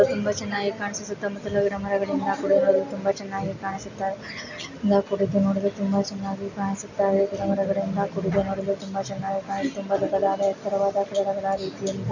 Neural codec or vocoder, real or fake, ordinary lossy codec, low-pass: none; real; none; 7.2 kHz